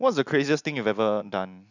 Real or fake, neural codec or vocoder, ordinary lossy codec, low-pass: real; none; none; 7.2 kHz